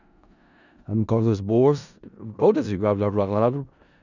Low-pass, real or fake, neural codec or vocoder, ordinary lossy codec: 7.2 kHz; fake; codec, 16 kHz in and 24 kHz out, 0.4 kbps, LongCat-Audio-Codec, four codebook decoder; none